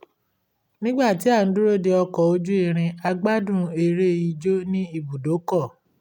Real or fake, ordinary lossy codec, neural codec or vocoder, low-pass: real; none; none; 19.8 kHz